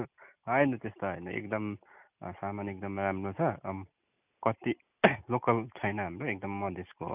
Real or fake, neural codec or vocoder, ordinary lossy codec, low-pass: real; none; none; 3.6 kHz